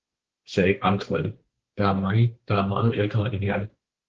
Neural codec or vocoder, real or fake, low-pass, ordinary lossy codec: codec, 16 kHz, 2 kbps, X-Codec, HuBERT features, trained on balanced general audio; fake; 7.2 kHz; Opus, 16 kbps